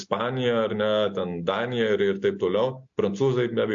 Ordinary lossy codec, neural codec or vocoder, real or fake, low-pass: AAC, 64 kbps; none; real; 7.2 kHz